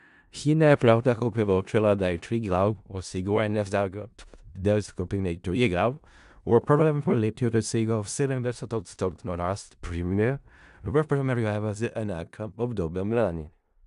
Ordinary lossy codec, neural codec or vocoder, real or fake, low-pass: AAC, 96 kbps; codec, 16 kHz in and 24 kHz out, 0.4 kbps, LongCat-Audio-Codec, four codebook decoder; fake; 10.8 kHz